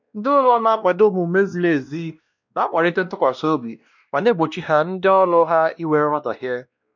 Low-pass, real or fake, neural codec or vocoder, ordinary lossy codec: 7.2 kHz; fake; codec, 16 kHz, 1 kbps, X-Codec, WavLM features, trained on Multilingual LibriSpeech; none